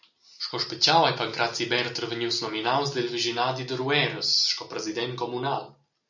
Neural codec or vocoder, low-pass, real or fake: none; 7.2 kHz; real